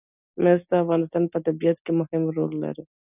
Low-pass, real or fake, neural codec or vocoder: 3.6 kHz; real; none